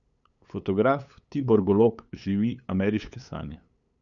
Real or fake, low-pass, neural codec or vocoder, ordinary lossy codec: fake; 7.2 kHz; codec, 16 kHz, 8 kbps, FunCodec, trained on LibriTTS, 25 frames a second; none